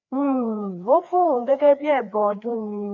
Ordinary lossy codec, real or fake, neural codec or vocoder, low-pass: none; fake; codec, 16 kHz, 2 kbps, FreqCodec, larger model; 7.2 kHz